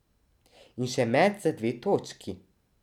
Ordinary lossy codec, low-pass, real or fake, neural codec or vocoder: none; 19.8 kHz; real; none